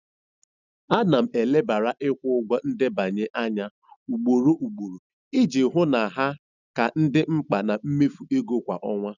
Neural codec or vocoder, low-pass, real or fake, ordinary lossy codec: none; 7.2 kHz; real; none